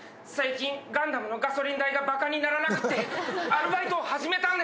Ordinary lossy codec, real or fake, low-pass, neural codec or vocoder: none; real; none; none